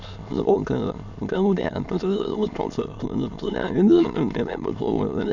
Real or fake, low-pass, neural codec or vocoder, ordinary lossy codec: fake; 7.2 kHz; autoencoder, 22.05 kHz, a latent of 192 numbers a frame, VITS, trained on many speakers; none